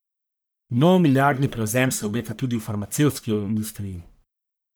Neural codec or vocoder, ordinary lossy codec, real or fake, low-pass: codec, 44.1 kHz, 1.7 kbps, Pupu-Codec; none; fake; none